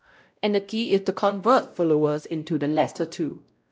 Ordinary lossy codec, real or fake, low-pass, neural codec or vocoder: none; fake; none; codec, 16 kHz, 0.5 kbps, X-Codec, WavLM features, trained on Multilingual LibriSpeech